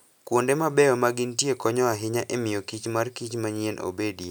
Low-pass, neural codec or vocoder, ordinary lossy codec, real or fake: none; none; none; real